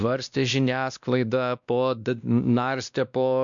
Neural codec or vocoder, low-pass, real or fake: codec, 16 kHz, 1 kbps, X-Codec, WavLM features, trained on Multilingual LibriSpeech; 7.2 kHz; fake